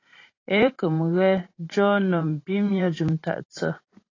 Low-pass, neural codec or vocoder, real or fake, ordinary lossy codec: 7.2 kHz; vocoder, 44.1 kHz, 128 mel bands every 512 samples, BigVGAN v2; fake; AAC, 32 kbps